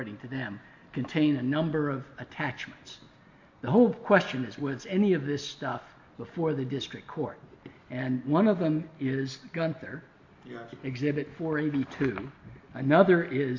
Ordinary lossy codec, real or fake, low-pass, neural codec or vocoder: MP3, 48 kbps; real; 7.2 kHz; none